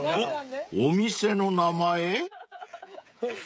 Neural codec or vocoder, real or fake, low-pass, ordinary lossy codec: codec, 16 kHz, 16 kbps, FreqCodec, smaller model; fake; none; none